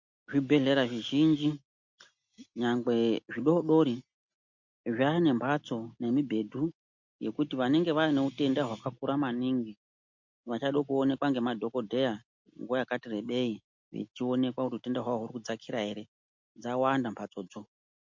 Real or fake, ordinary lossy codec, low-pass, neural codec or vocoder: real; MP3, 48 kbps; 7.2 kHz; none